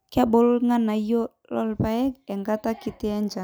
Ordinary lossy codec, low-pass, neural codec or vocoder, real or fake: none; none; none; real